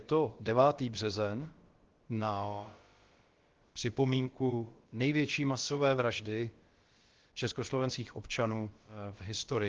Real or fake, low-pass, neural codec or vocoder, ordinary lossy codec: fake; 7.2 kHz; codec, 16 kHz, about 1 kbps, DyCAST, with the encoder's durations; Opus, 16 kbps